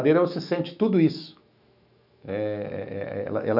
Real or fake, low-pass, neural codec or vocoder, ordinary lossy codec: fake; 5.4 kHz; autoencoder, 48 kHz, 128 numbers a frame, DAC-VAE, trained on Japanese speech; none